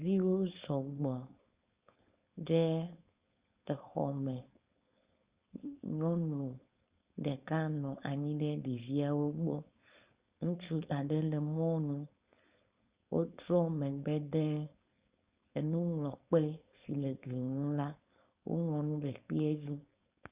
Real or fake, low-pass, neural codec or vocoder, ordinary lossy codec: fake; 3.6 kHz; codec, 16 kHz, 4.8 kbps, FACodec; Opus, 64 kbps